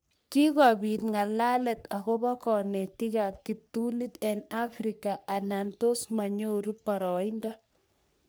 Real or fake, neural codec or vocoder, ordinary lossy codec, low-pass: fake; codec, 44.1 kHz, 3.4 kbps, Pupu-Codec; none; none